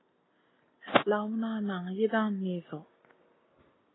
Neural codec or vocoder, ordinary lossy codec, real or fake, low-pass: none; AAC, 16 kbps; real; 7.2 kHz